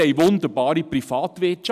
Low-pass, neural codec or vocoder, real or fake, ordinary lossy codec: 14.4 kHz; none; real; none